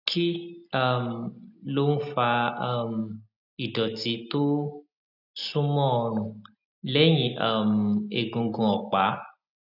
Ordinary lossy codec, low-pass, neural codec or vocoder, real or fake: none; 5.4 kHz; none; real